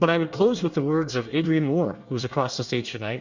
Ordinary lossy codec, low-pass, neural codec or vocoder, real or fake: Opus, 64 kbps; 7.2 kHz; codec, 24 kHz, 1 kbps, SNAC; fake